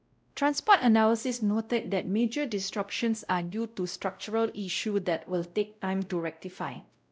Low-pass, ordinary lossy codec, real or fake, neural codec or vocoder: none; none; fake; codec, 16 kHz, 0.5 kbps, X-Codec, WavLM features, trained on Multilingual LibriSpeech